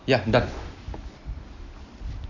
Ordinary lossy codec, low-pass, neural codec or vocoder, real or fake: none; 7.2 kHz; none; real